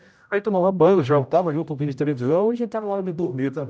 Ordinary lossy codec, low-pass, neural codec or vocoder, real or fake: none; none; codec, 16 kHz, 0.5 kbps, X-Codec, HuBERT features, trained on general audio; fake